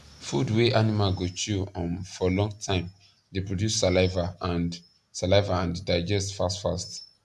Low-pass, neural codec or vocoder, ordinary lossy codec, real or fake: none; none; none; real